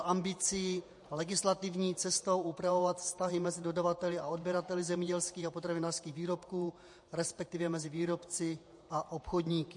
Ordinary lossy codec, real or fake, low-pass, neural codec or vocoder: MP3, 48 kbps; fake; 10.8 kHz; vocoder, 48 kHz, 128 mel bands, Vocos